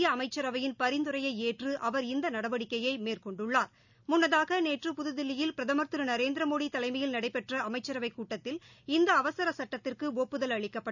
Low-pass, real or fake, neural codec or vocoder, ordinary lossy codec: 7.2 kHz; real; none; none